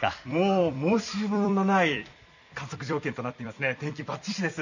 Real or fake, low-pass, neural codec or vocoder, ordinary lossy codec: fake; 7.2 kHz; vocoder, 44.1 kHz, 128 mel bands every 256 samples, BigVGAN v2; none